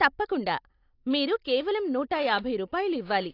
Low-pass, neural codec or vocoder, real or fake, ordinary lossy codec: 5.4 kHz; none; real; AAC, 32 kbps